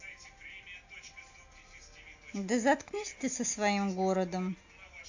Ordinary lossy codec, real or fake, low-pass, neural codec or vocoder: AAC, 48 kbps; real; 7.2 kHz; none